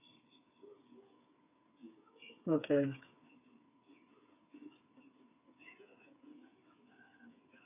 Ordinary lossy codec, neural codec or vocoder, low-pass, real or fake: none; codec, 16 kHz, 8 kbps, FreqCodec, smaller model; 3.6 kHz; fake